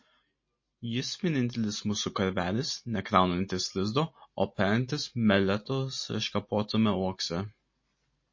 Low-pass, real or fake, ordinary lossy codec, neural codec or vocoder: 7.2 kHz; real; MP3, 32 kbps; none